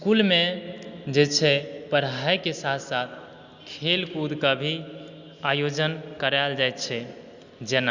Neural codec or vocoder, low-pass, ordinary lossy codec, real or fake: none; 7.2 kHz; Opus, 64 kbps; real